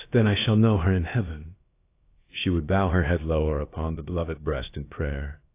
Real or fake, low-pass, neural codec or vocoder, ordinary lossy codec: fake; 3.6 kHz; codec, 16 kHz, about 1 kbps, DyCAST, with the encoder's durations; AAC, 24 kbps